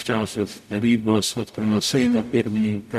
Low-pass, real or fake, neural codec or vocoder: 14.4 kHz; fake; codec, 44.1 kHz, 0.9 kbps, DAC